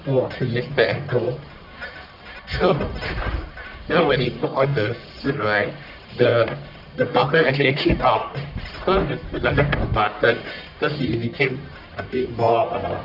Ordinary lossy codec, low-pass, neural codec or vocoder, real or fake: none; 5.4 kHz; codec, 44.1 kHz, 1.7 kbps, Pupu-Codec; fake